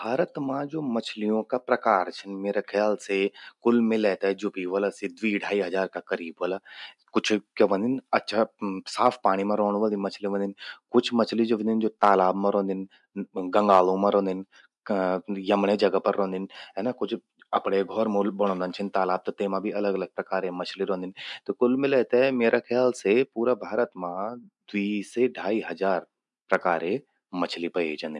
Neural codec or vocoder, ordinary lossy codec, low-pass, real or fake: none; none; 10.8 kHz; real